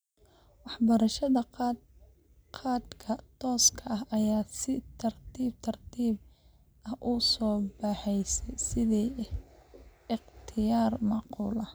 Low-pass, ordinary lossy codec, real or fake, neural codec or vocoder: none; none; real; none